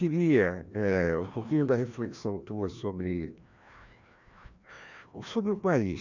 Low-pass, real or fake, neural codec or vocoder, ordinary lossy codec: 7.2 kHz; fake; codec, 16 kHz, 1 kbps, FreqCodec, larger model; none